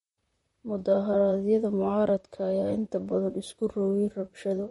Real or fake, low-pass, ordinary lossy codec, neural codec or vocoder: fake; 19.8 kHz; MP3, 48 kbps; vocoder, 44.1 kHz, 128 mel bands, Pupu-Vocoder